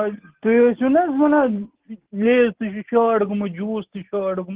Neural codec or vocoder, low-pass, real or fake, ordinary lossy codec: none; 3.6 kHz; real; Opus, 16 kbps